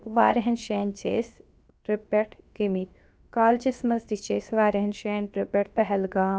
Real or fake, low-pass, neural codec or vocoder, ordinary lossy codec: fake; none; codec, 16 kHz, 0.7 kbps, FocalCodec; none